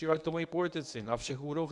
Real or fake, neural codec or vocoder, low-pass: fake; codec, 24 kHz, 0.9 kbps, WavTokenizer, medium speech release version 1; 10.8 kHz